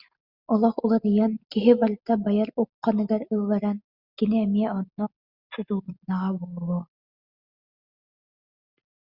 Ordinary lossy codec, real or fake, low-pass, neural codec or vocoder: Opus, 64 kbps; real; 5.4 kHz; none